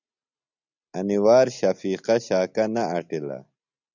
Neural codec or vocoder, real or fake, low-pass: none; real; 7.2 kHz